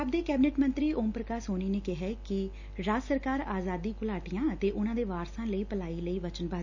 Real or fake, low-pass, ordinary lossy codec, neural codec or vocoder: real; 7.2 kHz; none; none